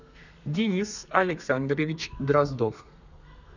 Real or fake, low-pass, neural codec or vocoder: fake; 7.2 kHz; codec, 32 kHz, 1.9 kbps, SNAC